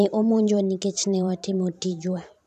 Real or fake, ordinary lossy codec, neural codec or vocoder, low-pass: fake; none; vocoder, 44.1 kHz, 128 mel bands every 512 samples, BigVGAN v2; 14.4 kHz